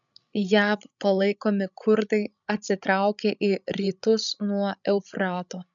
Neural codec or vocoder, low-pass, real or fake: codec, 16 kHz, 16 kbps, FreqCodec, larger model; 7.2 kHz; fake